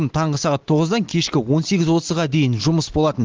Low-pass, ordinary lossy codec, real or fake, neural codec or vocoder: 7.2 kHz; Opus, 16 kbps; real; none